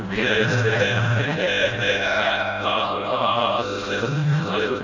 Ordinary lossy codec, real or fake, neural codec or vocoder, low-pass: AAC, 48 kbps; fake; codec, 16 kHz, 0.5 kbps, FreqCodec, smaller model; 7.2 kHz